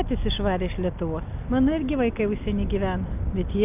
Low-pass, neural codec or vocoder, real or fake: 3.6 kHz; none; real